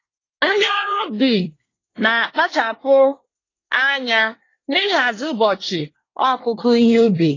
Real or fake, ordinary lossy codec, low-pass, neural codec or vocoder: fake; AAC, 32 kbps; 7.2 kHz; codec, 24 kHz, 1 kbps, SNAC